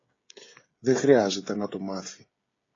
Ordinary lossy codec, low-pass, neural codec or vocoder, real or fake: AAC, 32 kbps; 7.2 kHz; codec, 16 kHz, 16 kbps, FreqCodec, smaller model; fake